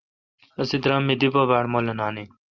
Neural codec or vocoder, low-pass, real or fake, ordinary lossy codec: none; 7.2 kHz; real; Opus, 24 kbps